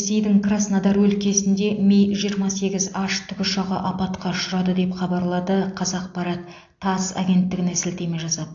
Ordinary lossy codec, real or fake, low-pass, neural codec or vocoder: AAC, 48 kbps; real; 7.2 kHz; none